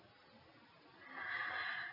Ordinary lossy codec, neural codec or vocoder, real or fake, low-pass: MP3, 48 kbps; none; real; 5.4 kHz